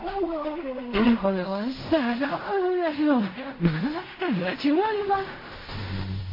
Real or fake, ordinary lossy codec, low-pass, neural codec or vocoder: fake; MP3, 24 kbps; 5.4 kHz; codec, 16 kHz in and 24 kHz out, 0.4 kbps, LongCat-Audio-Codec, fine tuned four codebook decoder